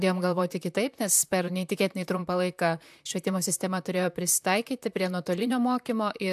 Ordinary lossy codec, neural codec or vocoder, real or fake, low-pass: AAC, 96 kbps; vocoder, 44.1 kHz, 128 mel bands, Pupu-Vocoder; fake; 14.4 kHz